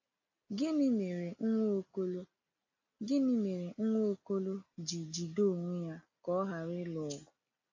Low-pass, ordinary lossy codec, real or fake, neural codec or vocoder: 7.2 kHz; AAC, 48 kbps; real; none